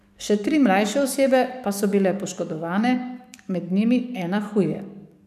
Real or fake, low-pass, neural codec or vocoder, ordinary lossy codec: fake; 14.4 kHz; codec, 44.1 kHz, 7.8 kbps, DAC; none